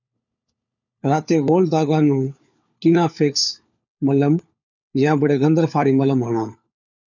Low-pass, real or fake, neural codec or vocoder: 7.2 kHz; fake; codec, 16 kHz, 4 kbps, FunCodec, trained on LibriTTS, 50 frames a second